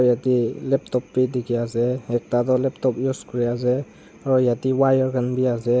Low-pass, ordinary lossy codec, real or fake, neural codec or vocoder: none; none; real; none